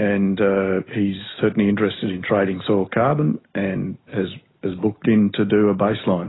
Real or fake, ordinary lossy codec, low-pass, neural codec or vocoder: real; AAC, 16 kbps; 7.2 kHz; none